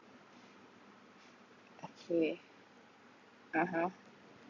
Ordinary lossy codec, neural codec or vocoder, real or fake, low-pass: none; none; real; 7.2 kHz